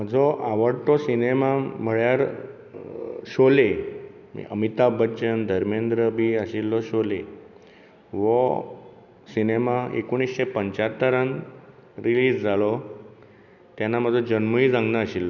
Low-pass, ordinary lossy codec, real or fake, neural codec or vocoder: 7.2 kHz; none; real; none